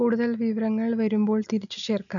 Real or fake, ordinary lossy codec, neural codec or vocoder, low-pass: real; none; none; 7.2 kHz